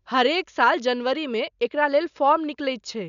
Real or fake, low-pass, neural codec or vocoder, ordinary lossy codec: real; 7.2 kHz; none; none